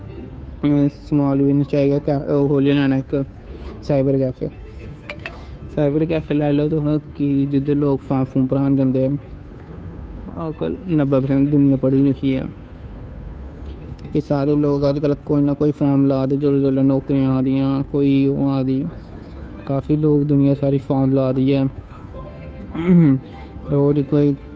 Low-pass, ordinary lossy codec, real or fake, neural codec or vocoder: none; none; fake; codec, 16 kHz, 2 kbps, FunCodec, trained on Chinese and English, 25 frames a second